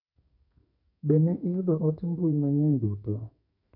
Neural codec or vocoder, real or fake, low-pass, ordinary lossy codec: codec, 44.1 kHz, 2.6 kbps, SNAC; fake; 5.4 kHz; none